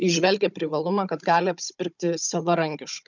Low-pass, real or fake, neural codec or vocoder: 7.2 kHz; fake; codec, 16 kHz, 16 kbps, FunCodec, trained on Chinese and English, 50 frames a second